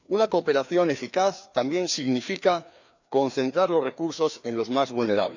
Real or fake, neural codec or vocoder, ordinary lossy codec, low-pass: fake; codec, 16 kHz, 2 kbps, FreqCodec, larger model; none; 7.2 kHz